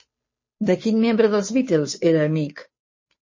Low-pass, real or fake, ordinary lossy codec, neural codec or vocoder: 7.2 kHz; fake; MP3, 32 kbps; codec, 16 kHz, 2 kbps, FunCodec, trained on Chinese and English, 25 frames a second